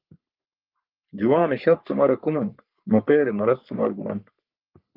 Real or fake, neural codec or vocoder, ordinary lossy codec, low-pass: fake; codec, 44.1 kHz, 3.4 kbps, Pupu-Codec; Opus, 32 kbps; 5.4 kHz